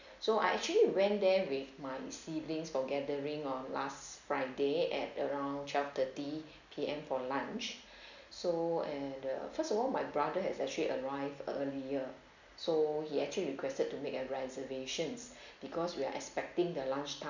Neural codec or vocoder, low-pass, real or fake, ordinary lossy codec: none; 7.2 kHz; real; none